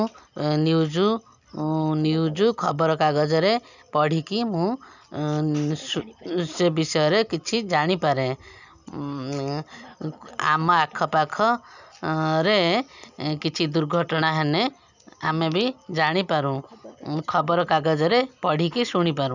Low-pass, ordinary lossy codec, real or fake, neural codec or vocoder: 7.2 kHz; none; real; none